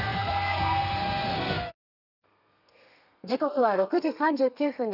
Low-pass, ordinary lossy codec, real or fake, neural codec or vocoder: 5.4 kHz; none; fake; codec, 32 kHz, 1.9 kbps, SNAC